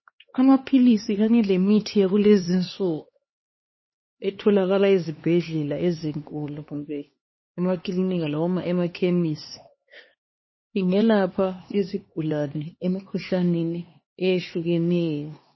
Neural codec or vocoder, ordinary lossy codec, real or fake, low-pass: codec, 16 kHz, 2 kbps, X-Codec, HuBERT features, trained on LibriSpeech; MP3, 24 kbps; fake; 7.2 kHz